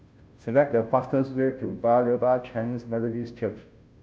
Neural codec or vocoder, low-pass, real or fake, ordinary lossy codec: codec, 16 kHz, 0.5 kbps, FunCodec, trained on Chinese and English, 25 frames a second; none; fake; none